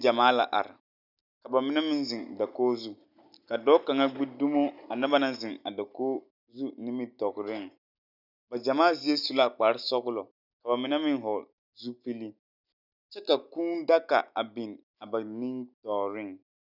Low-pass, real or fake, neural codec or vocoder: 5.4 kHz; real; none